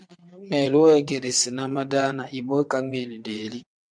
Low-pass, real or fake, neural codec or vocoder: 9.9 kHz; fake; vocoder, 22.05 kHz, 80 mel bands, WaveNeXt